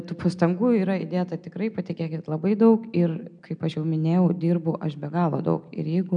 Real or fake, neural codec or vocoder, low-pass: real; none; 9.9 kHz